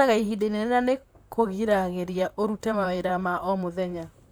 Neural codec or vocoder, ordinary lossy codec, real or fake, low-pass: vocoder, 44.1 kHz, 128 mel bands, Pupu-Vocoder; none; fake; none